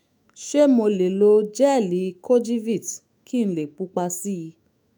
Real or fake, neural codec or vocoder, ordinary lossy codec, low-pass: fake; autoencoder, 48 kHz, 128 numbers a frame, DAC-VAE, trained on Japanese speech; none; none